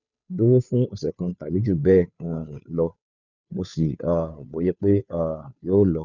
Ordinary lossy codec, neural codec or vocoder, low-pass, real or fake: none; codec, 16 kHz, 2 kbps, FunCodec, trained on Chinese and English, 25 frames a second; 7.2 kHz; fake